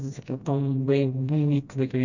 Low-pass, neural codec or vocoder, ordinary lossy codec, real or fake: 7.2 kHz; codec, 16 kHz, 1 kbps, FreqCodec, smaller model; none; fake